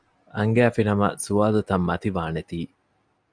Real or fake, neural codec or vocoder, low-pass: real; none; 9.9 kHz